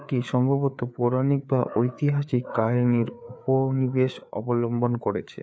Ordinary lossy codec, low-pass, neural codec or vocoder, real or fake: none; none; codec, 16 kHz, 4 kbps, FreqCodec, larger model; fake